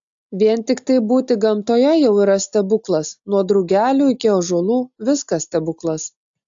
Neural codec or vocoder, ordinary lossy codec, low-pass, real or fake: none; AAC, 64 kbps; 7.2 kHz; real